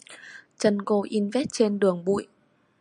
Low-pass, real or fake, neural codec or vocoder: 10.8 kHz; real; none